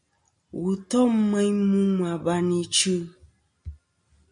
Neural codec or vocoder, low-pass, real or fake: none; 9.9 kHz; real